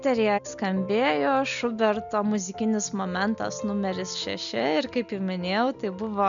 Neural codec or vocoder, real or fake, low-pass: none; real; 7.2 kHz